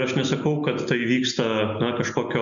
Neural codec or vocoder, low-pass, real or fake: none; 7.2 kHz; real